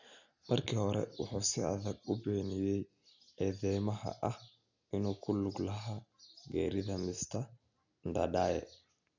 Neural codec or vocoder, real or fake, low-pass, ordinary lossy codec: none; real; 7.2 kHz; none